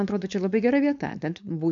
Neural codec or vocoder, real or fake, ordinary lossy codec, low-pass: codec, 16 kHz, 4.8 kbps, FACodec; fake; MP3, 64 kbps; 7.2 kHz